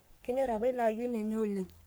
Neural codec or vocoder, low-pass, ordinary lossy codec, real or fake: codec, 44.1 kHz, 3.4 kbps, Pupu-Codec; none; none; fake